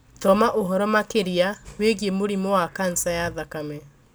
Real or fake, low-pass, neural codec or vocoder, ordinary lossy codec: real; none; none; none